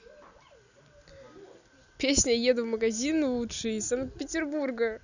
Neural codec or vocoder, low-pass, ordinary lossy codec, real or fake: none; 7.2 kHz; none; real